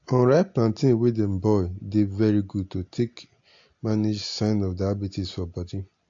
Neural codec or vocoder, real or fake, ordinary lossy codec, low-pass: none; real; AAC, 48 kbps; 7.2 kHz